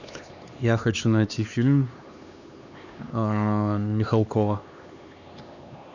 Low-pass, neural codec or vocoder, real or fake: 7.2 kHz; codec, 16 kHz, 2 kbps, X-Codec, HuBERT features, trained on LibriSpeech; fake